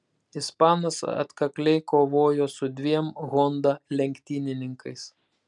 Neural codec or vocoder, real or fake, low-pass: none; real; 10.8 kHz